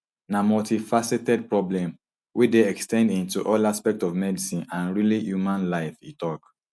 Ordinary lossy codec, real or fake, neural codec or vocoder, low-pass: none; real; none; none